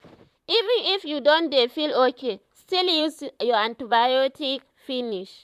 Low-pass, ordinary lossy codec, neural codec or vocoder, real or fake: 14.4 kHz; none; vocoder, 44.1 kHz, 128 mel bands, Pupu-Vocoder; fake